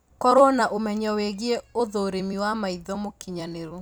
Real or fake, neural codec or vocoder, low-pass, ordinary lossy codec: fake; vocoder, 44.1 kHz, 128 mel bands every 256 samples, BigVGAN v2; none; none